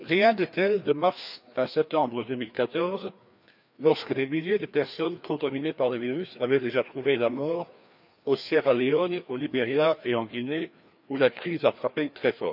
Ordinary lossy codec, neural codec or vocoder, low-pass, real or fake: none; codec, 16 kHz, 2 kbps, FreqCodec, larger model; 5.4 kHz; fake